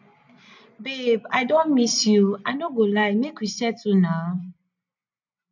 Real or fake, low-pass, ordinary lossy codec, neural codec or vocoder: real; 7.2 kHz; none; none